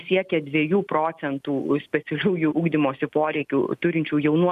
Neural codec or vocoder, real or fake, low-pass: none; real; 14.4 kHz